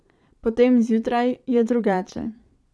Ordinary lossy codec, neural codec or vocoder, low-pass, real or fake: none; vocoder, 22.05 kHz, 80 mel bands, Vocos; none; fake